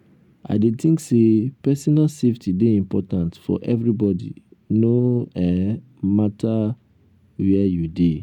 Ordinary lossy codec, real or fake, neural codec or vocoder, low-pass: none; real; none; 19.8 kHz